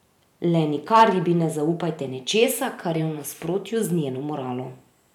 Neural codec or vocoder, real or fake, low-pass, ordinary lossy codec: vocoder, 44.1 kHz, 128 mel bands every 512 samples, BigVGAN v2; fake; 19.8 kHz; none